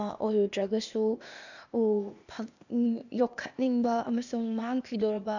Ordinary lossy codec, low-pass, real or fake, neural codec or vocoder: none; 7.2 kHz; fake; codec, 16 kHz, 0.8 kbps, ZipCodec